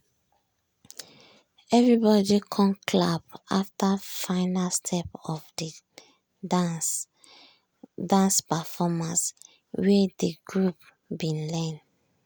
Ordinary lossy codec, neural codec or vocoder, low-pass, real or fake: none; none; none; real